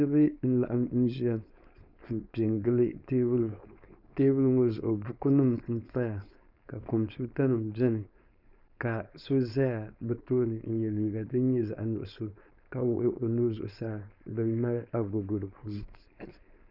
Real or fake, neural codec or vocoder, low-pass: fake; codec, 16 kHz, 4.8 kbps, FACodec; 5.4 kHz